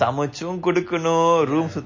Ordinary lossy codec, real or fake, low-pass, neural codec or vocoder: MP3, 32 kbps; real; 7.2 kHz; none